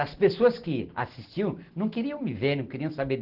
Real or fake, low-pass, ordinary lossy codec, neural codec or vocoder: real; 5.4 kHz; Opus, 16 kbps; none